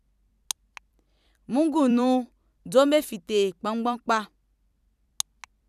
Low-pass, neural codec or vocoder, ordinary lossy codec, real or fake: 14.4 kHz; vocoder, 44.1 kHz, 128 mel bands every 256 samples, BigVGAN v2; none; fake